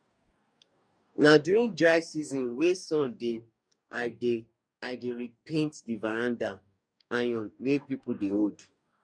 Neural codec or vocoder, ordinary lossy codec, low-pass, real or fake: codec, 44.1 kHz, 2.6 kbps, DAC; none; 9.9 kHz; fake